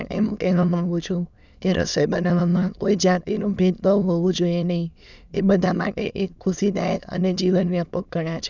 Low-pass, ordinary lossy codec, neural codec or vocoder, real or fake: 7.2 kHz; none; autoencoder, 22.05 kHz, a latent of 192 numbers a frame, VITS, trained on many speakers; fake